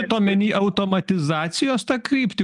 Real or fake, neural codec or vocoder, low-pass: real; none; 10.8 kHz